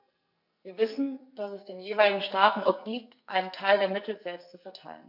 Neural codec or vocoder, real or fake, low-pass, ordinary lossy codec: codec, 44.1 kHz, 2.6 kbps, SNAC; fake; 5.4 kHz; none